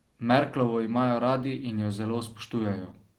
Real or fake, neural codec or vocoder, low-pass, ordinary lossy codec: real; none; 19.8 kHz; Opus, 16 kbps